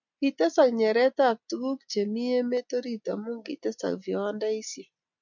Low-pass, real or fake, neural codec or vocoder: 7.2 kHz; real; none